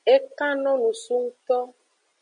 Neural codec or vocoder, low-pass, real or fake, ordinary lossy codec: none; 9.9 kHz; real; MP3, 96 kbps